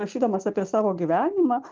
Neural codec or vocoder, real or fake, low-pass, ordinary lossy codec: none; real; 7.2 kHz; Opus, 32 kbps